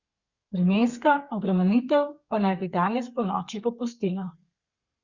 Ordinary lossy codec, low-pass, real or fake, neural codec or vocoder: Opus, 64 kbps; 7.2 kHz; fake; codec, 32 kHz, 1.9 kbps, SNAC